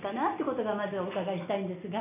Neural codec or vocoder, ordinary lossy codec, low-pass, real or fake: none; none; 3.6 kHz; real